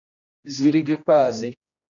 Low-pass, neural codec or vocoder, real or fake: 7.2 kHz; codec, 16 kHz, 1 kbps, X-Codec, HuBERT features, trained on general audio; fake